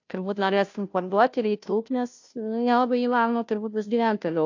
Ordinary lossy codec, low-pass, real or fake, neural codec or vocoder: AAC, 48 kbps; 7.2 kHz; fake; codec, 16 kHz, 0.5 kbps, FunCodec, trained on Chinese and English, 25 frames a second